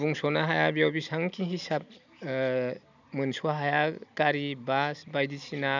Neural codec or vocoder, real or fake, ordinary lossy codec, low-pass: none; real; none; 7.2 kHz